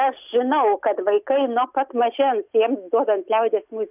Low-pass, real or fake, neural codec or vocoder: 3.6 kHz; real; none